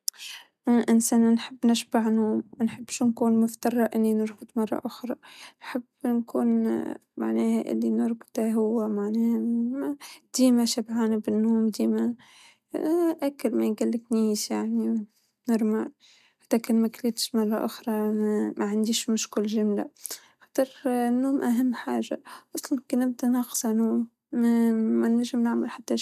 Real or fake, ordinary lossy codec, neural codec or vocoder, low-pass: real; none; none; 14.4 kHz